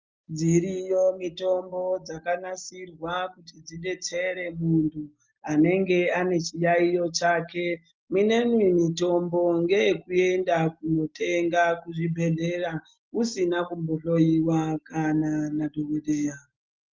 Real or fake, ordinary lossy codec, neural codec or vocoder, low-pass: real; Opus, 32 kbps; none; 7.2 kHz